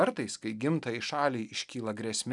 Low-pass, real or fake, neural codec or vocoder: 10.8 kHz; real; none